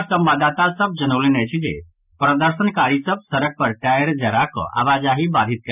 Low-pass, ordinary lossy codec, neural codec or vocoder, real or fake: 3.6 kHz; none; none; real